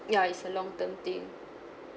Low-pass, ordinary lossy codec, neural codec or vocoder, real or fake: none; none; none; real